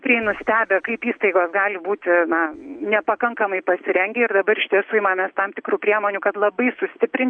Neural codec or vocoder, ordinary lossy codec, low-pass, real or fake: none; AAC, 64 kbps; 9.9 kHz; real